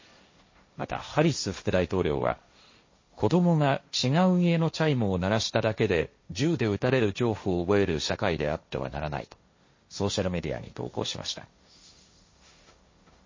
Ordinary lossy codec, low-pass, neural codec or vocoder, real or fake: MP3, 32 kbps; 7.2 kHz; codec, 16 kHz, 1.1 kbps, Voila-Tokenizer; fake